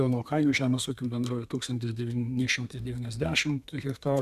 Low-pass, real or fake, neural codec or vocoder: 14.4 kHz; fake; codec, 32 kHz, 1.9 kbps, SNAC